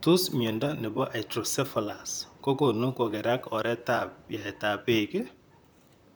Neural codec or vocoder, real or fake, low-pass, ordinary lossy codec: vocoder, 44.1 kHz, 128 mel bands, Pupu-Vocoder; fake; none; none